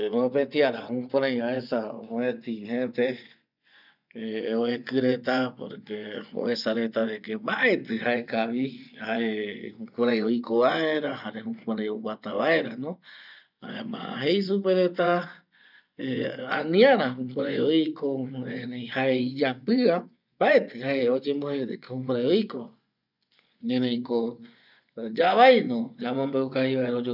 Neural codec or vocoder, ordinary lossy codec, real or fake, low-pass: vocoder, 24 kHz, 100 mel bands, Vocos; none; fake; 5.4 kHz